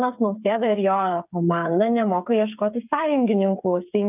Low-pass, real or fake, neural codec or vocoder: 3.6 kHz; fake; codec, 16 kHz, 8 kbps, FreqCodec, smaller model